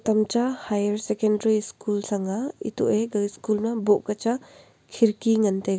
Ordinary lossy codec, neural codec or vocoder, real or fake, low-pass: none; none; real; none